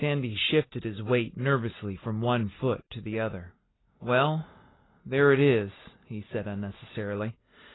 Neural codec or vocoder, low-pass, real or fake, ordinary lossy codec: none; 7.2 kHz; real; AAC, 16 kbps